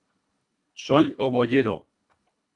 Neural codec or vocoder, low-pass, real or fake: codec, 24 kHz, 1.5 kbps, HILCodec; 10.8 kHz; fake